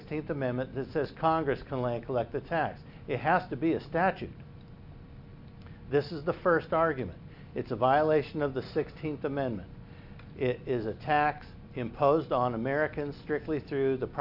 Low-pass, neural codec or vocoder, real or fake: 5.4 kHz; none; real